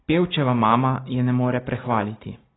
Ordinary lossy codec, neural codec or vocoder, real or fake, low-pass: AAC, 16 kbps; none; real; 7.2 kHz